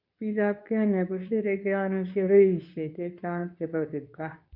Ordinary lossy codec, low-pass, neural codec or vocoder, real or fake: none; 5.4 kHz; codec, 24 kHz, 0.9 kbps, WavTokenizer, medium speech release version 2; fake